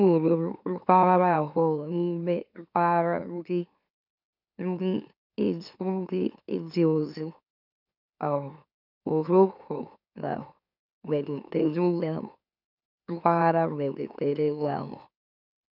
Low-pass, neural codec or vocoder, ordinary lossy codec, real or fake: 5.4 kHz; autoencoder, 44.1 kHz, a latent of 192 numbers a frame, MeloTTS; none; fake